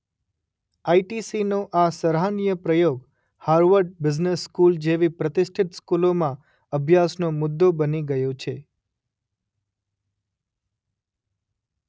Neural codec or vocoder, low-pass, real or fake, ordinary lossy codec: none; none; real; none